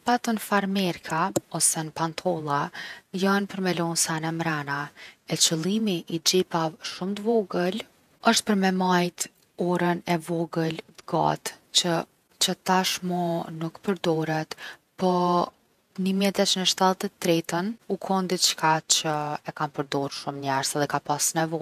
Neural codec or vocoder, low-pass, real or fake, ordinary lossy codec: vocoder, 48 kHz, 128 mel bands, Vocos; 14.4 kHz; fake; none